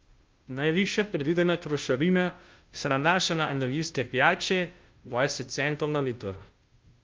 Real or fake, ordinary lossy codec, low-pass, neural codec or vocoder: fake; Opus, 24 kbps; 7.2 kHz; codec, 16 kHz, 0.5 kbps, FunCodec, trained on Chinese and English, 25 frames a second